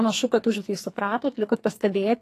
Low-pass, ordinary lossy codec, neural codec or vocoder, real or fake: 14.4 kHz; AAC, 48 kbps; codec, 44.1 kHz, 2.6 kbps, SNAC; fake